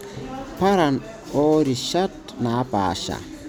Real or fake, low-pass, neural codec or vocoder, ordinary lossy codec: real; none; none; none